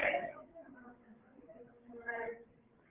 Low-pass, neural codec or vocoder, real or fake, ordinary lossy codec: 3.6 kHz; codec, 16 kHz, 8 kbps, FreqCodec, larger model; fake; Opus, 16 kbps